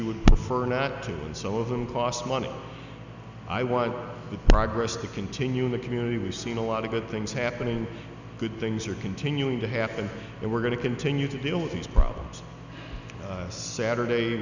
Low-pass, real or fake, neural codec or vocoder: 7.2 kHz; real; none